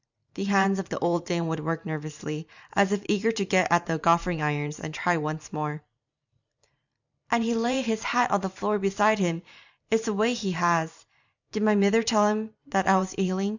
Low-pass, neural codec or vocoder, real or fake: 7.2 kHz; vocoder, 44.1 kHz, 128 mel bands every 512 samples, BigVGAN v2; fake